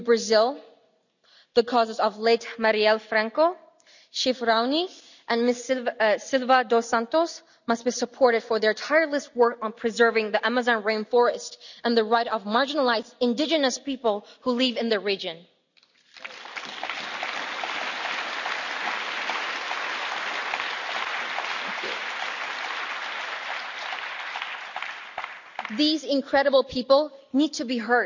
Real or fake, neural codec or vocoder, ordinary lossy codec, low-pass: real; none; none; 7.2 kHz